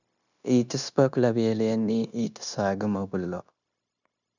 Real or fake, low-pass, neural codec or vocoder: fake; 7.2 kHz; codec, 16 kHz, 0.9 kbps, LongCat-Audio-Codec